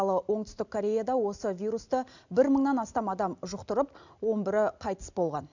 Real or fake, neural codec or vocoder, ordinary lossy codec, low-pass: real; none; none; 7.2 kHz